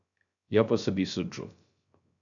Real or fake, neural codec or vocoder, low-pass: fake; codec, 16 kHz, 0.3 kbps, FocalCodec; 7.2 kHz